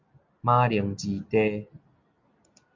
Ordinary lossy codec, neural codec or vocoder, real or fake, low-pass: MP3, 48 kbps; none; real; 7.2 kHz